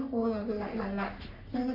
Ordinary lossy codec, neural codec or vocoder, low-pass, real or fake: none; codec, 44.1 kHz, 3.4 kbps, Pupu-Codec; 5.4 kHz; fake